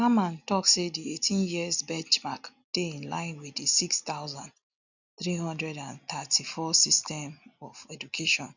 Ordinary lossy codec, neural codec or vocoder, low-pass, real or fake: none; none; 7.2 kHz; real